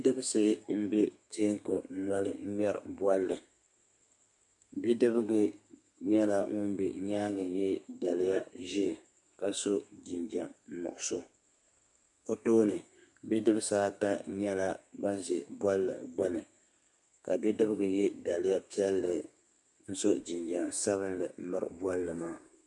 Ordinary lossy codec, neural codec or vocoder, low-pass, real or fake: MP3, 64 kbps; codec, 32 kHz, 1.9 kbps, SNAC; 10.8 kHz; fake